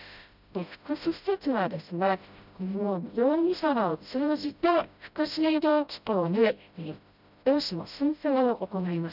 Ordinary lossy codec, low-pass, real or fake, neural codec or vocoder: none; 5.4 kHz; fake; codec, 16 kHz, 0.5 kbps, FreqCodec, smaller model